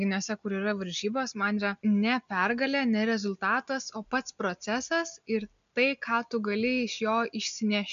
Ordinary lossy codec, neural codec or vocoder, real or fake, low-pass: MP3, 96 kbps; none; real; 7.2 kHz